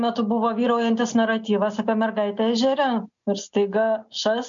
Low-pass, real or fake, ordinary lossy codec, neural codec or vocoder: 7.2 kHz; real; MP3, 64 kbps; none